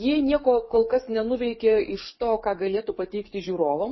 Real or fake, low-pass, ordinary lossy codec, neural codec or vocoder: fake; 7.2 kHz; MP3, 24 kbps; codec, 44.1 kHz, 7.8 kbps, DAC